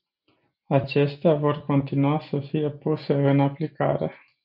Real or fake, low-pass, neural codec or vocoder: real; 5.4 kHz; none